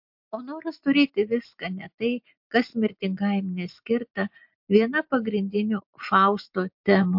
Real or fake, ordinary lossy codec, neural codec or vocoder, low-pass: real; MP3, 48 kbps; none; 5.4 kHz